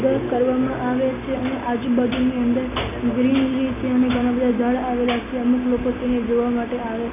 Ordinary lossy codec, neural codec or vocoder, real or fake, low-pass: none; none; real; 3.6 kHz